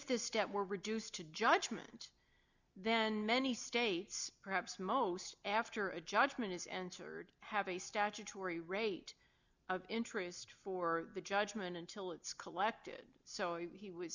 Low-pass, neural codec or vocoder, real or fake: 7.2 kHz; none; real